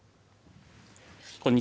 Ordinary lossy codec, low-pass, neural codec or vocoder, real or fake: none; none; none; real